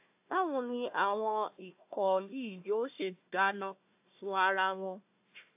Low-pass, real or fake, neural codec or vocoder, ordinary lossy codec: 3.6 kHz; fake; codec, 16 kHz, 1 kbps, FunCodec, trained on Chinese and English, 50 frames a second; AAC, 32 kbps